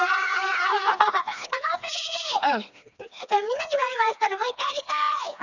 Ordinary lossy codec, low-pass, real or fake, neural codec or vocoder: none; 7.2 kHz; fake; codec, 16 kHz, 2 kbps, FreqCodec, smaller model